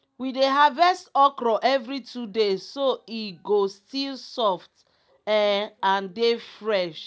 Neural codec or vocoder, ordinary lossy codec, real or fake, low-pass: none; none; real; none